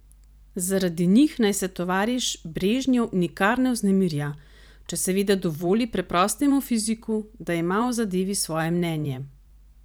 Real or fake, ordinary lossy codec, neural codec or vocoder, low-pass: fake; none; vocoder, 44.1 kHz, 128 mel bands every 512 samples, BigVGAN v2; none